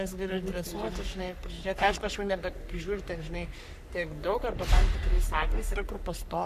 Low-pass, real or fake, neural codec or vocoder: 14.4 kHz; fake; codec, 44.1 kHz, 3.4 kbps, Pupu-Codec